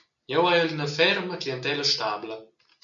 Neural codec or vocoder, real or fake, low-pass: none; real; 7.2 kHz